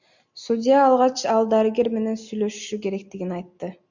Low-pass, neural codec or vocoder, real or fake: 7.2 kHz; none; real